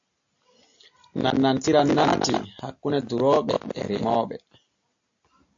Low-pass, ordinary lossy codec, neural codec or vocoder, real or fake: 7.2 kHz; AAC, 48 kbps; none; real